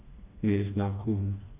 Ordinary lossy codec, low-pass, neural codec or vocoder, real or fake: AAC, 24 kbps; 3.6 kHz; codec, 16 kHz, 2 kbps, FreqCodec, smaller model; fake